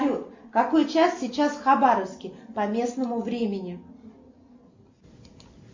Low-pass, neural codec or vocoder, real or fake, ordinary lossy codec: 7.2 kHz; none; real; MP3, 48 kbps